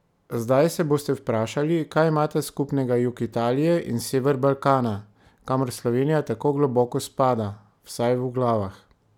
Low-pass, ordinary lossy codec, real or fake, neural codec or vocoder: 19.8 kHz; none; real; none